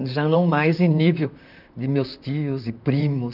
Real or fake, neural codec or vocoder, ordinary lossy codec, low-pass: fake; vocoder, 44.1 kHz, 128 mel bands, Pupu-Vocoder; none; 5.4 kHz